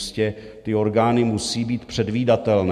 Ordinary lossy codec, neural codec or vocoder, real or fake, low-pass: MP3, 64 kbps; none; real; 14.4 kHz